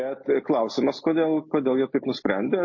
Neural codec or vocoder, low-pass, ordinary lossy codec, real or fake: none; 7.2 kHz; MP3, 32 kbps; real